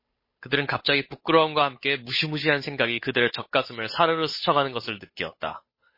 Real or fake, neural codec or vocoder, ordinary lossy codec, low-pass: fake; codec, 16 kHz, 8 kbps, FunCodec, trained on Chinese and English, 25 frames a second; MP3, 24 kbps; 5.4 kHz